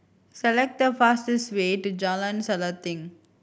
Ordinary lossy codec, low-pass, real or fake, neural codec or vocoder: none; none; real; none